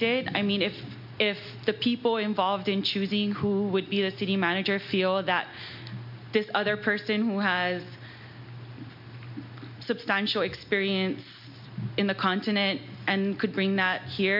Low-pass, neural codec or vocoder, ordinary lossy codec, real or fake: 5.4 kHz; none; MP3, 48 kbps; real